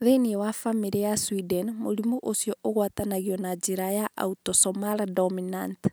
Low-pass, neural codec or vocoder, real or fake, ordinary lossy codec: none; none; real; none